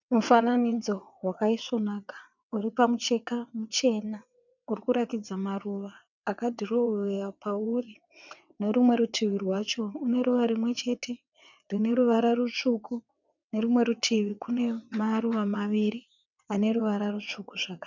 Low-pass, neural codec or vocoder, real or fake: 7.2 kHz; vocoder, 22.05 kHz, 80 mel bands, WaveNeXt; fake